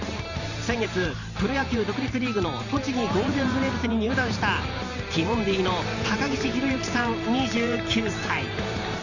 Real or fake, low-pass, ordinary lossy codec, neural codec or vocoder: fake; 7.2 kHz; none; vocoder, 44.1 kHz, 128 mel bands every 512 samples, BigVGAN v2